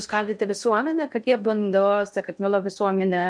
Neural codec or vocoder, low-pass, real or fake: codec, 16 kHz in and 24 kHz out, 0.6 kbps, FocalCodec, streaming, 2048 codes; 9.9 kHz; fake